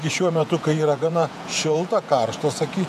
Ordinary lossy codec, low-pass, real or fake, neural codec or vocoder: AAC, 96 kbps; 14.4 kHz; fake; vocoder, 48 kHz, 128 mel bands, Vocos